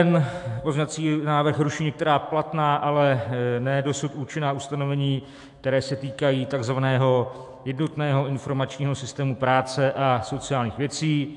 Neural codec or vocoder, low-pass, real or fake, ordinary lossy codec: autoencoder, 48 kHz, 128 numbers a frame, DAC-VAE, trained on Japanese speech; 10.8 kHz; fake; AAC, 64 kbps